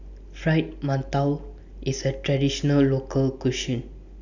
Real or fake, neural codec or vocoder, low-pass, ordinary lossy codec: real; none; 7.2 kHz; none